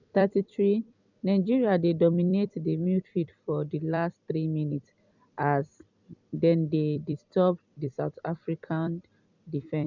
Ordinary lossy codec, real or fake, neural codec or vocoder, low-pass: none; fake; vocoder, 44.1 kHz, 128 mel bands every 256 samples, BigVGAN v2; 7.2 kHz